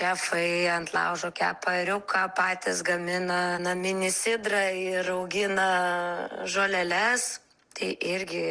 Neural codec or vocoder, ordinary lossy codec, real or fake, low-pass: none; Opus, 24 kbps; real; 9.9 kHz